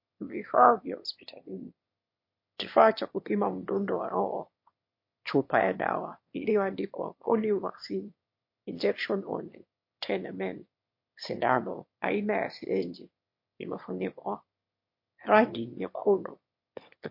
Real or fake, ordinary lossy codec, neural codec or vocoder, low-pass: fake; MP3, 32 kbps; autoencoder, 22.05 kHz, a latent of 192 numbers a frame, VITS, trained on one speaker; 5.4 kHz